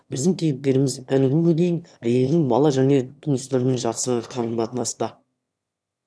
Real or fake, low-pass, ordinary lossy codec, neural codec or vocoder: fake; none; none; autoencoder, 22.05 kHz, a latent of 192 numbers a frame, VITS, trained on one speaker